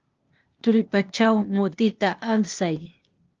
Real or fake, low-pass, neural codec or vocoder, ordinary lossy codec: fake; 7.2 kHz; codec, 16 kHz, 0.8 kbps, ZipCodec; Opus, 24 kbps